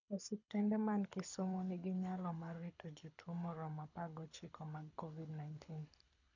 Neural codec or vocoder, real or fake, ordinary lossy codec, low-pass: codec, 24 kHz, 6 kbps, HILCodec; fake; none; 7.2 kHz